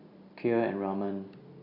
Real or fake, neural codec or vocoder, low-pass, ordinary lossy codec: real; none; 5.4 kHz; none